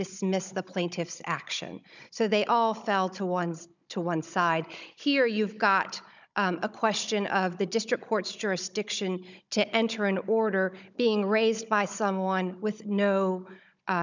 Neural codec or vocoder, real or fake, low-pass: codec, 16 kHz, 16 kbps, FunCodec, trained on Chinese and English, 50 frames a second; fake; 7.2 kHz